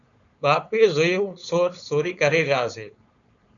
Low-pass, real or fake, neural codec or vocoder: 7.2 kHz; fake; codec, 16 kHz, 4.8 kbps, FACodec